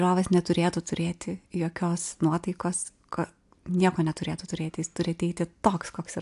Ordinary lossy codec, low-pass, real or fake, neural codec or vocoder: AAC, 64 kbps; 10.8 kHz; real; none